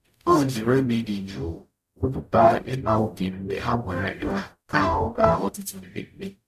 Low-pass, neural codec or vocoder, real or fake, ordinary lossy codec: 14.4 kHz; codec, 44.1 kHz, 0.9 kbps, DAC; fake; none